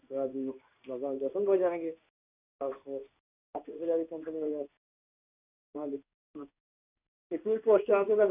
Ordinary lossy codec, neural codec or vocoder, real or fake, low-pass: none; codec, 16 kHz in and 24 kHz out, 1 kbps, XY-Tokenizer; fake; 3.6 kHz